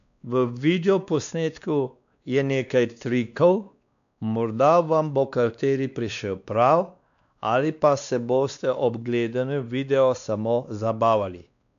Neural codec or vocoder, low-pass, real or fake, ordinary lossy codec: codec, 16 kHz, 2 kbps, X-Codec, WavLM features, trained on Multilingual LibriSpeech; 7.2 kHz; fake; none